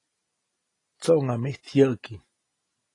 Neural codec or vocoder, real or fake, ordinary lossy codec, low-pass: none; real; AAC, 32 kbps; 10.8 kHz